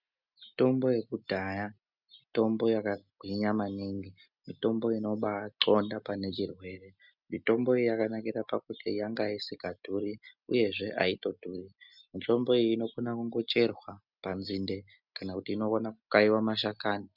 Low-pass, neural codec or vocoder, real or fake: 5.4 kHz; none; real